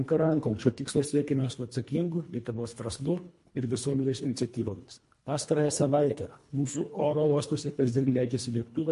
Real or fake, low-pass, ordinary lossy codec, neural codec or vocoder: fake; 10.8 kHz; MP3, 48 kbps; codec, 24 kHz, 1.5 kbps, HILCodec